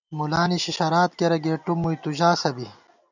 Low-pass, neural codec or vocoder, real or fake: 7.2 kHz; none; real